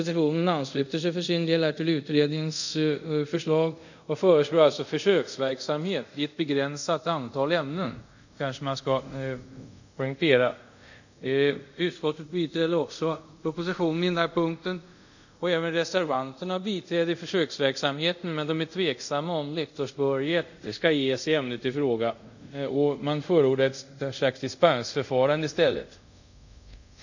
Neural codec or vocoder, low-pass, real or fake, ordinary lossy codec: codec, 24 kHz, 0.5 kbps, DualCodec; 7.2 kHz; fake; none